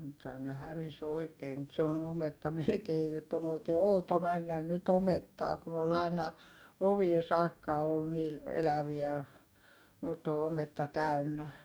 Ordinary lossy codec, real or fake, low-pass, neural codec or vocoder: none; fake; none; codec, 44.1 kHz, 2.6 kbps, DAC